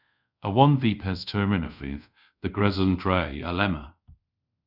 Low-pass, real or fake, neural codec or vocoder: 5.4 kHz; fake; codec, 24 kHz, 0.5 kbps, DualCodec